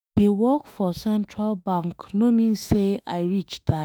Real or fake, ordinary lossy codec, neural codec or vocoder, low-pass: fake; none; autoencoder, 48 kHz, 32 numbers a frame, DAC-VAE, trained on Japanese speech; none